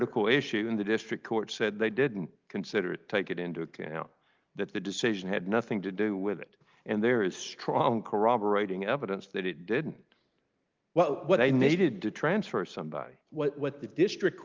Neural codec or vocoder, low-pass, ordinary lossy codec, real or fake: none; 7.2 kHz; Opus, 32 kbps; real